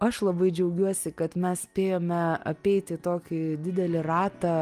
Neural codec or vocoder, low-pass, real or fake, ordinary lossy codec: none; 14.4 kHz; real; Opus, 32 kbps